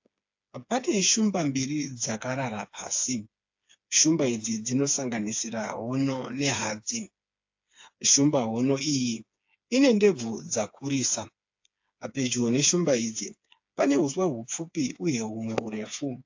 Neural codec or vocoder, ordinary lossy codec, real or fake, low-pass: codec, 16 kHz, 4 kbps, FreqCodec, smaller model; AAC, 48 kbps; fake; 7.2 kHz